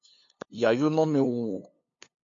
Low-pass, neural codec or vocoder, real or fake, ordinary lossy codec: 7.2 kHz; codec, 16 kHz, 2 kbps, FunCodec, trained on LibriTTS, 25 frames a second; fake; MP3, 48 kbps